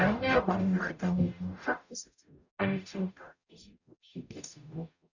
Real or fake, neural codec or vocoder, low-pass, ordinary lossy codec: fake; codec, 44.1 kHz, 0.9 kbps, DAC; 7.2 kHz; none